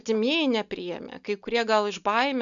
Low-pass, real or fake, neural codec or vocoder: 7.2 kHz; real; none